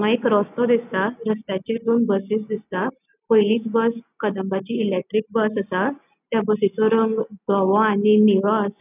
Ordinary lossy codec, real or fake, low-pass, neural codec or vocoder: none; real; 3.6 kHz; none